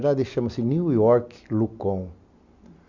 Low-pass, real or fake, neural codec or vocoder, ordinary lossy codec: 7.2 kHz; real; none; Opus, 64 kbps